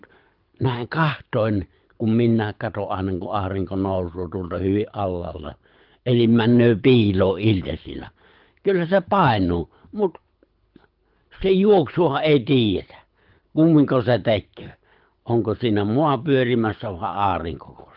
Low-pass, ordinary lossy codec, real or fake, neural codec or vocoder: 5.4 kHz; Opus, 32 kbps; fake; codec, 24 kHz, 6 kbps, HILCodec